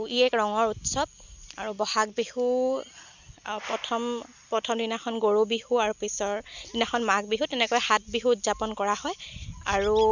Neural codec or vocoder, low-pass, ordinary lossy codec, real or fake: none; 7.2 kHz; none; real